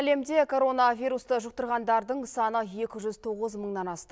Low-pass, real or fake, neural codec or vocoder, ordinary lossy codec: none; real; none; none